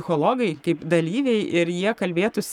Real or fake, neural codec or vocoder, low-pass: fake; codec, 44.1 kHz, 7.8 kbps, Pupu-Codec; 19.8 kHz